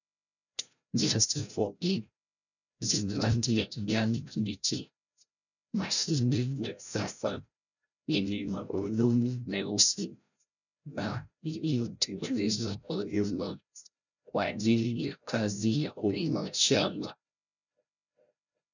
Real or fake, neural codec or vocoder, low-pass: fake; codec, 16 kHz, 0.5 kbps, FreqCodec, larger model; 7.2 kHz